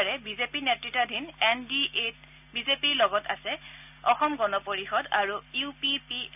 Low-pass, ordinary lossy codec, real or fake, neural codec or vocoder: 3.6 kHz; none; real; none